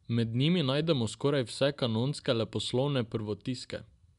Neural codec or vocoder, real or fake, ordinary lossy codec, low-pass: none; real; MP3, 96 kbps; 10.8 kHz